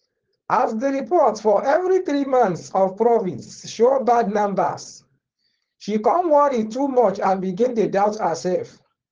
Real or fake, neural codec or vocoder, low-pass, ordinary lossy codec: fake; codec, 16 kHz, 4.8 kbps, FACodec; 7.2 kHz; Opus, 16 kbps